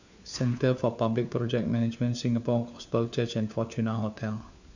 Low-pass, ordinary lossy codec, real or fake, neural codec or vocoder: 7.2 kHz; none; fake; codec, 16 kHz, 4 kbps, FunCodec, trained on LibriTTS, 50 frames a second